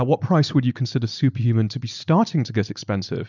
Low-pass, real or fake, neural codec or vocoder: 7.2 kHz; real; none